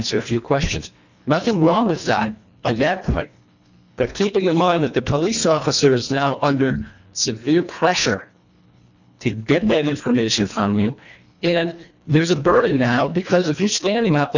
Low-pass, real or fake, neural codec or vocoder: 7.2 kHz; fake; codec, 24 kHz, 1.5 kbps, HILCodec